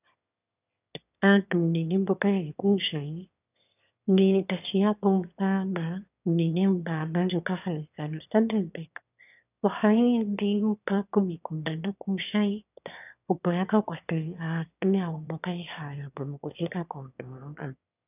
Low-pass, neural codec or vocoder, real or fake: 3.6 kHz; autoencoder, 22.05 kHz, a latent of 192 numbers a frame, VITS, trained on one speaker; fake